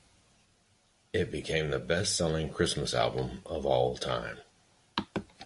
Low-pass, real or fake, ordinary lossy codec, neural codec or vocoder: 14.4 kHz; real; MP3, 48 kbps; none